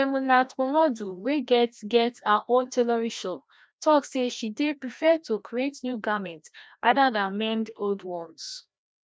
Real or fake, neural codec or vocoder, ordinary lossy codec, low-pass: fake; codec, 16 kHz, 1 kbps, FreqCodec, larger model; none; none